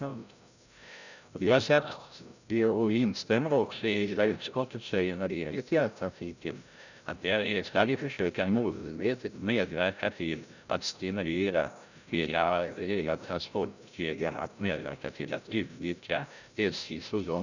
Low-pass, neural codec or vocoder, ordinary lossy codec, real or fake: 7.2 kHz; codec, 16 kHz, 0.5 kbps, FreqCodec, larger model; none; fake